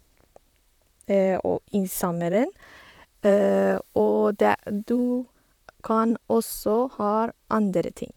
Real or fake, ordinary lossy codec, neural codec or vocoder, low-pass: fake; none; vocoder, 44.1 kHz, 128 mel bands, Pupu-Vocoder; 19.8 kHz